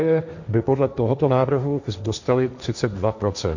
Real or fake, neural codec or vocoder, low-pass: fake; codec, 16 kHz, 1.1 kbps, Voila-Tokenizer; 7.2 kHz